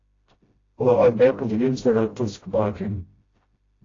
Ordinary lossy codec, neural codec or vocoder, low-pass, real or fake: AAC, 32 kbps; codec, 16 kHz, 0.5 kbps, FreqCodec, smaller model; 7.2 kHz; fake